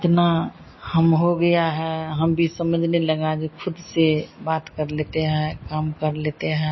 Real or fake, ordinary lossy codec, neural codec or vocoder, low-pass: real; MP3, 24 kbps; none; 7.2 kHz